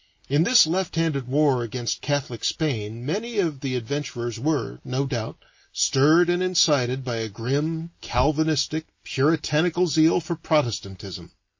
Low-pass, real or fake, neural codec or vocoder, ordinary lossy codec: 7.2 kHz; real; none; MP3, 32 kbps